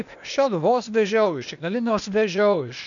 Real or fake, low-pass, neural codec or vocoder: fake; 7.2 kHz; codec, 16 kHz, 0.8 kbps, ZipCodec